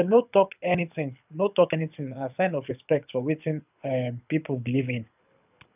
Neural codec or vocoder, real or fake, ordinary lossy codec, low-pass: codec, 24 kHz, 6 kbps, HILCodec; fake; none; 3.6 kHz